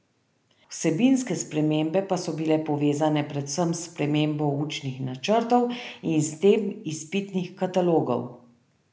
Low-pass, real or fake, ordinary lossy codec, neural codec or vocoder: none; real; none; none